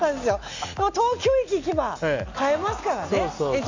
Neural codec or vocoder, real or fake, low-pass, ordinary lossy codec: none; real; 7.2 kHz; AAC, 48 kbps